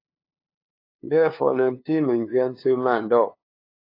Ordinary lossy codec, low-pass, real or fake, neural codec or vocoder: AAC, 32 kbps; 5.4 kHz; fake; codec, 16 kHz, 2 kbps, FunCodec, trained on LibriTTS, 25 frames a second